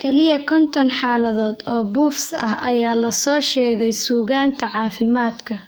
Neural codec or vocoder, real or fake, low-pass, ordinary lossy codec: codec, 44.1 kHz, 2.6 kbps, SNAC; fake; none; none